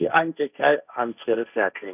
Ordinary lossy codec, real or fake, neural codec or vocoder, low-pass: none; fake; codec, 16 kHz, 1.1 kbps, Voila-Tokenizer; 3.6 kHz